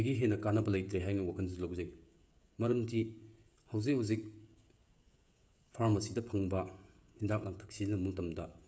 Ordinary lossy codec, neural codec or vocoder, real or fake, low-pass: none; codec, 16 kHz, 16 kbps, FreqCodec, smaller model; fake; none